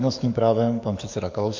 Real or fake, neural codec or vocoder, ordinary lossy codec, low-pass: fake; codec, 44.1 kHz, 7.8 kbps, DAC; MP3, 48 kbps; 7.2 kHz